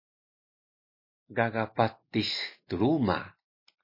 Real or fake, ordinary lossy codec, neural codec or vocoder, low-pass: real; MP3, 24 kbps; none; 5.4 kHz